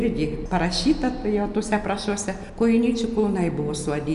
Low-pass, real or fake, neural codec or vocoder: 10.8 kHz; real; none